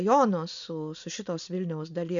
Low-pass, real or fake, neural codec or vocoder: 7.2 kHz; real; none